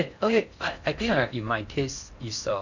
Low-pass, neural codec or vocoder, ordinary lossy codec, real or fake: 7.2 kHz; codec, 16 kHz in and 24 kHz out, 0.6 kbps, FocalCodec, streaming, 4096 codes; none; fake